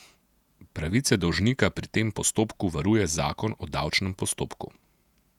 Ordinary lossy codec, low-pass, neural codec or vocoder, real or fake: none; 19.8 kHz; vocoder, 48 kHz, 128 mel bands, Vocos; fake